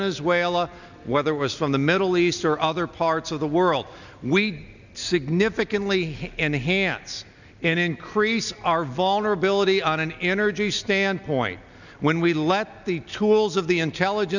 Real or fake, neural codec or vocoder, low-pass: real; none; 7.2 kHz